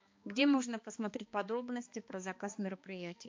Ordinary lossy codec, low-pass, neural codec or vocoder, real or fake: MP3, 48 kbps; 7.2 kHz; codec, 16 kHz, 2 kbps, X-Codec, HuBERT features, trained on balanced general audio; fake